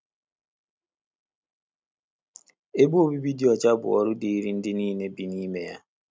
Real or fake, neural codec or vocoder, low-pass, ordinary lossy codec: real; none; none; none